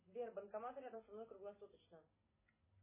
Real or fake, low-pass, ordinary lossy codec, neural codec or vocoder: real; 3.6 kHz; MP3, 16 kbps; none